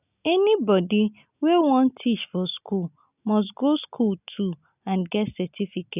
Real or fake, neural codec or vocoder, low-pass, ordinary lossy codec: real; none; 3.6 kHz; none